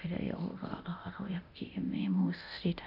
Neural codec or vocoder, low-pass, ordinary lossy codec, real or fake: codec, 24 kHz, 0.9 kbps, DualCodec; 5.4 kHz; none; fake